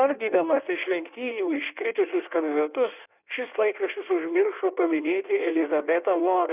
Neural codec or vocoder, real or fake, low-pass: codec, 16 kHz in and 24 kHz out, 1.1 kbps, FireRedTTS-2 codec; fake; 3.6 kHz